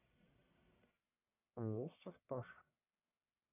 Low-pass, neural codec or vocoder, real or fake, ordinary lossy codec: 3.6 kHz; codec, 44.1 kHz, 1.7 kbps, Pupu-Codec; fake; none